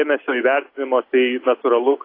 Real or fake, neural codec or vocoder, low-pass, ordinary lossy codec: real; none; 5.4 kHz; AAC, 24 kbps